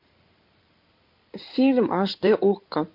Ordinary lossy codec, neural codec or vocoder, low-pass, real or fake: MP3, 48 kbps; codec, 16 kHz in and 24 kHz out, 2.2 kbps, FireRedTTS-2 codec; 5.4 kHz; fake